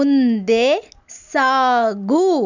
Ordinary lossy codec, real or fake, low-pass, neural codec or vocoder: none; real; 7.2 kHz; none